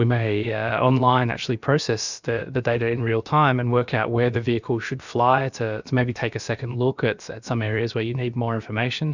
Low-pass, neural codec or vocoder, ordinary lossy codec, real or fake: 7.2 kHz; codec, 16 kHz, about 1 kbps, DyCAST, with the encoder's durations; Opus, 64 kbps; fake